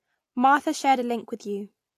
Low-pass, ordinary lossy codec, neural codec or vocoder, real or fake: 14.4 kHz; AAC, 64 kbps; none; real